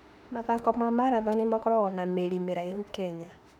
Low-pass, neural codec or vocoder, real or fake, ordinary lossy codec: 19.8 kHz; autoencoder, 48 kHz, 32 numbers a frame, DAC-VAE, trained on Japanese speech; fake; none